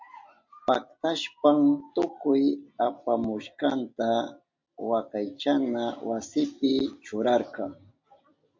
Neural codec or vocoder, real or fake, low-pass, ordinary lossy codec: none; real; 7.2 kHz; MP3, 48 kbps